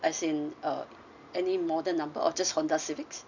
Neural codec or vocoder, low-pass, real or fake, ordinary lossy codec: none; 7.2 kHz; real; none